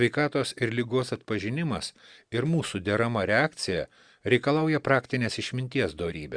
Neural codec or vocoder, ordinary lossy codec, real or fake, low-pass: none; Opus, 64 kbps; real; 9.9 kHz